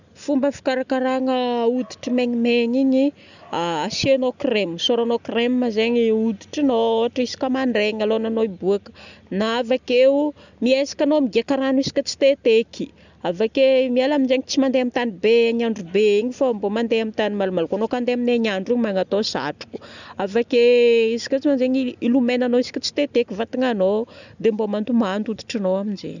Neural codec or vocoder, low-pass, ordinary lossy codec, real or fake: none; 7.2 kHz; none; real